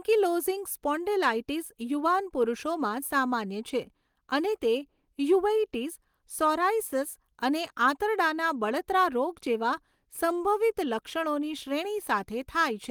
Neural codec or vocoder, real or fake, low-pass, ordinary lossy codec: none; real; 19.8 kHz; Opus, 24 kbps